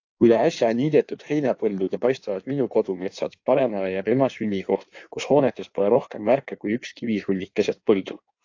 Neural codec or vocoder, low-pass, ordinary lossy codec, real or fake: codec, 16 kHz in and 24 kHz out, 1.1 kbps, FireRedTTS-2 codec; 7.2 kHz; AAC, 48 kbps; fake